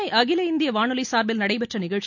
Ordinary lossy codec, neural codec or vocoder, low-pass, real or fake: none; none; 7.2 kHz; real